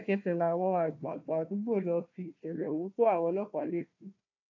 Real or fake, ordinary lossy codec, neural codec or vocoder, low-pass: fake; AAC, 48 kbps; codec, 16 kHz, 1 kbps, FunCodec, trained on Chinese and English, 50 frames a second; 7.2 kHz